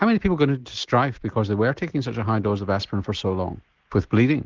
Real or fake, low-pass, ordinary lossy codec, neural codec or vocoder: real; 7.2 kHz; Opus, 16 kbps; none